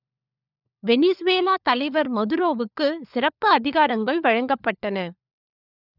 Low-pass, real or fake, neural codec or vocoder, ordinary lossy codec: 5.4 kHz; fake; codec, 16 kHz, 4 kbps, FunCodec, trained on LibriTTS, 50 frames a second; none